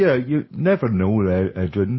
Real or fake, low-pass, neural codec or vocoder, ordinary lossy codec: real; 7.2 kHz; none; MP3, 24 kbps